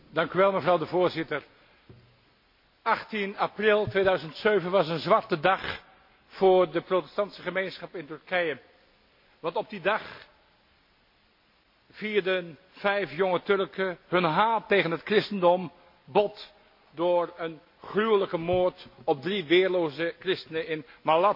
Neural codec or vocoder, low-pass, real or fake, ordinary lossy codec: none; 5.4 kHz; real; MP3, 32 kbps